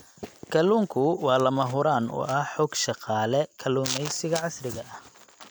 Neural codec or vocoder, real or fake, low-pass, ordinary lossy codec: none; real; none; none